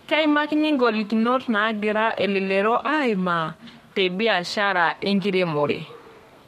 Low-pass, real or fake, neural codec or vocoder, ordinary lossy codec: 14.4 kHz; fake; codec, 32 kHz, 1.9 kbps, SNAC; MP3, 64 kbps